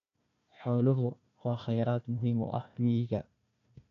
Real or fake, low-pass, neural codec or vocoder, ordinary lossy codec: fake; 7.2 kHz; codec, 16 kHz, 1 kbps, FunCodec, trained on Chinese and English, 50 frames a second; AAC, 96 kbps